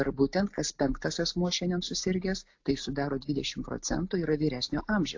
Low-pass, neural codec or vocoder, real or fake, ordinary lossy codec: 7.2 kHz; none; real; AAC, 48 kbps